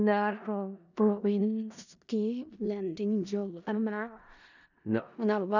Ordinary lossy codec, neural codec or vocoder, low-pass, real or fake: none; codec, 16 kHz in and 24 kHz out, 0.4 kbps, LongCat-Audio-Codec, four codebook decoder; 7.2 kHz; fake